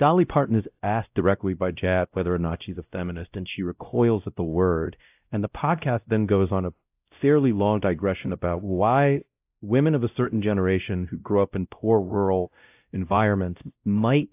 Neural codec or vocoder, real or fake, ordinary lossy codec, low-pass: codec, 16 kHz, 0.5 kbps, X-Codec, WavLM features, trained on Multilingual LibriSpeech; fake; AAC, 32 kbps; 3.6 kHz